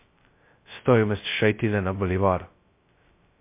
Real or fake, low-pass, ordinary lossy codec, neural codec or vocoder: fake; 3.6 kHz; MP3, 24 kbps; codec, 16 kHz, 0.2 kbps, FocalCodec